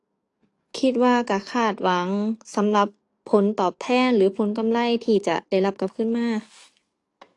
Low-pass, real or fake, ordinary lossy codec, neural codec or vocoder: 10.8 kHz; fake; AAC, 48 kbps; codec, 44.1 kHz, 7.8 kbps, DAC